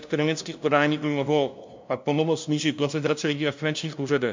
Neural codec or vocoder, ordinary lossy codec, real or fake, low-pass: codec, 16 kHz, 0.5 kbps, FunCodec, trained on LibriTTS, 25 frames a second; MP3, 64 kbps; fake; 7.2 kHz